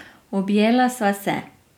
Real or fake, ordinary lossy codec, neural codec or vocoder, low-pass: real; none; none; 19.8 kHz